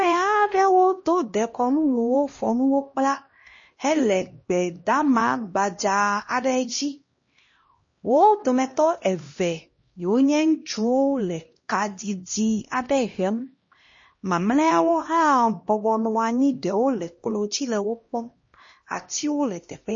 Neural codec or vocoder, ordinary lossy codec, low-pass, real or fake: codec, 16 kHz, 1 kbps, X-Codec, HuBERT features, trained on LibriSpeech; MP3, 32 kbps; 7.2 kHz; fake